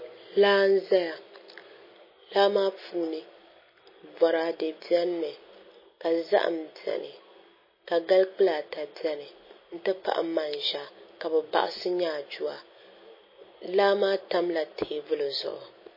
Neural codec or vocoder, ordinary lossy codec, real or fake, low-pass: none; MP3, 24 kbps; real; 5.4 kHz